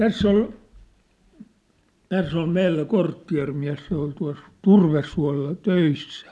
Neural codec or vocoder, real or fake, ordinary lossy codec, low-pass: none; real; none; none